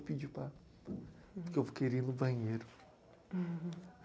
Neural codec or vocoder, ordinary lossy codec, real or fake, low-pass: none; none; real; none